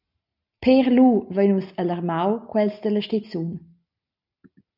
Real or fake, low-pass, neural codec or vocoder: real; 5.4 kHz; none